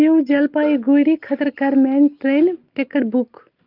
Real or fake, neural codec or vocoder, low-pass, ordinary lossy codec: real; none; 5.4 kHz; Opus, 32 kbps